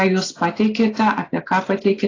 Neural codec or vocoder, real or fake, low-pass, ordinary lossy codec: none; real; 7.2 kHz; AAC, 32 kbps